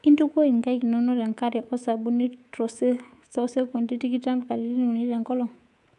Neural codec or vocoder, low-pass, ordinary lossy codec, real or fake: codec, 24 kHz, 3.1 kbps, DualCodec; 10.8 kHz; none; fake